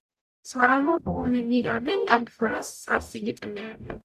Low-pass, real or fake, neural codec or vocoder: 14.4 kHz; fake; codec, 44.1 kHz, 0.9 kbps, DAC